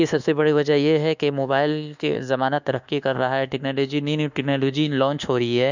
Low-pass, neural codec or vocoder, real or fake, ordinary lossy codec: 7.2 kHz; codec, 24 kHz, 1.2 kbps, DualCodec; fake; none